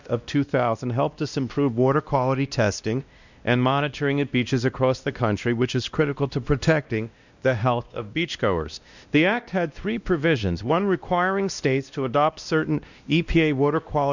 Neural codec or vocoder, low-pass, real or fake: codec, 16 kHz, 1 kbps, X-Codec, WavLM features, trained on Multilingual LibriSpeech; 7.2 kHz; fake